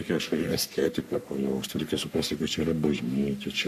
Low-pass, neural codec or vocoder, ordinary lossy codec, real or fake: 14.4 kHz; codec, 44.1 kHz, 3.4 kbps, Pupu-Codec; AAC, 96 kbps; fake